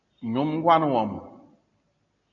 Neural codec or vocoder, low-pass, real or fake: none; 7.2 kHz; real